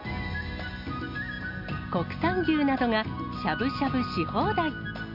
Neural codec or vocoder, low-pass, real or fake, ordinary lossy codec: none; 5.4 kHz; real; MP3, 48 kbps